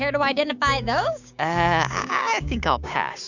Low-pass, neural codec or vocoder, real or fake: 7.2 kHz; codec, 16 kHz, 6 kbps, DAC; fake